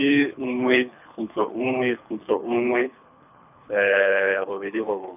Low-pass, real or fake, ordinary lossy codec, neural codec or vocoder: 3.6 kHz; fake; none; codec, 24 kHz, 3 kbps, HILCodec